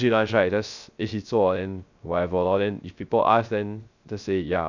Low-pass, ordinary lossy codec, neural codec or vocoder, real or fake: 7.2 kHz; none; codec, 16 kHz, 0.3 kbps, FocalCodec; fake